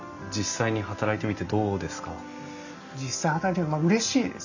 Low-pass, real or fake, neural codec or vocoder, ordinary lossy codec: 7.2 kHz; real; none; none